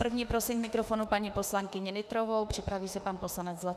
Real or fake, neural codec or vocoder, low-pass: fake; autoencoder, 48 kHz, 32 numbers a frame, DAC-VAE, trained on Japanese speech; 14.4 kHz